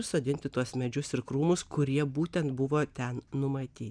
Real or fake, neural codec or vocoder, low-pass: real; none; 9.9 kHz